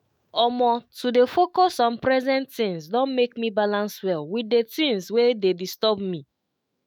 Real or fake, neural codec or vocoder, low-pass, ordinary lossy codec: fake; autoencoder, 48 kHz, 128 numbers a frame, DAC-VAE, trained on Japanese speech; none; none